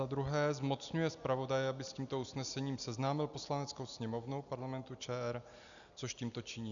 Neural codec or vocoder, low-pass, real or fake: none; 7.2 kHz; real